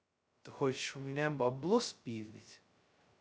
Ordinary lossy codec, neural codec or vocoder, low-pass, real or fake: none; codec, 16 kHz, 0.2 kbps, FocalCodec; none; fake